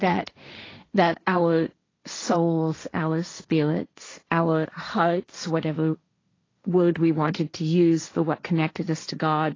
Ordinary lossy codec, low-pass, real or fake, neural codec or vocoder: AAC, 32 kbps; 7.2 kHz; fake; codec, 16 kHz, 1.1 kbps, Voila-Tokenizer